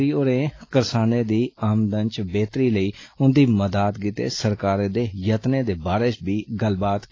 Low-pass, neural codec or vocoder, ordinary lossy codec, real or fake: 7.2 kHz; none; AAC, 32 kbps; real